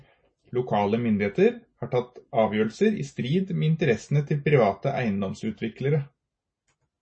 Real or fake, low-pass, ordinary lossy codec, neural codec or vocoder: real; 9.9 kHz; MP3, 32 kbps; none